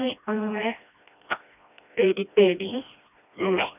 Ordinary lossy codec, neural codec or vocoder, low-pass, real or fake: none; codec, 16 kHz, 1 kbps, FreqCodec, smaller model; 3.6 kHz; fake